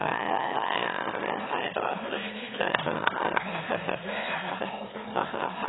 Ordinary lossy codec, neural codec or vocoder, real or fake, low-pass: AAC, 16 kbps; autoencoder, 22.05 kHz, a latent of 192 numbers a frame, VITS, trained on one speaker; fake; 7.2 kHz